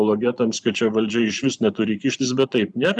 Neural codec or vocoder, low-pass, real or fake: vocoder, 44.1 kHz, 128 mel bands every 512 samples, BigVGAN v2; 10.8 kHz; fake